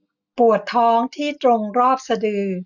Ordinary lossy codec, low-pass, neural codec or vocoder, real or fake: none; 7.2 kHz; none; real